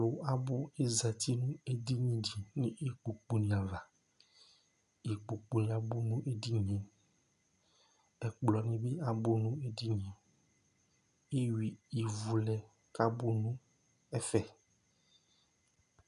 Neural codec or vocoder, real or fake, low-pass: none; real; 10.8 kHz